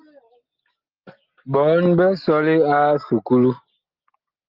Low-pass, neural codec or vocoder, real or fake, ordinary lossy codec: 5.4 kHz; none; real; Opus, 32 kbps